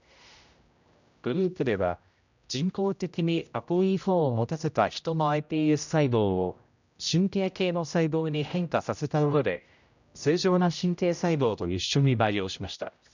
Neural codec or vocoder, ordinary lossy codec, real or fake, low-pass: codec, 16 kHz, 0.5 kbps, X-Codec, HuBERT features, trained on general audio; none; fake; 7.2 kHz